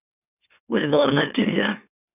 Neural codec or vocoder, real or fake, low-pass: autoencoder, 44.1 kHz, a latent of 192 numbers a frame, MeloTTS; fake; 3.6 kHz